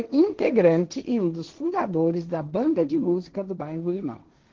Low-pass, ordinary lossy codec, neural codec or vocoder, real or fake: 7.2 kHz; Opus, 16 kbps; codec, 16 kHz, 1.1 kbps, Voila-Tokenizer; fake